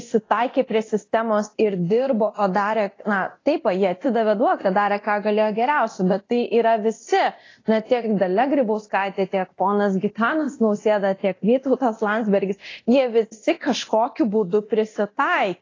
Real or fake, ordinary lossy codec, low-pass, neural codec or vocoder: fake; AAC, 32 kbps; 7.2 kHz; codec, 24 kHz, 0.9 kbps, DualCodec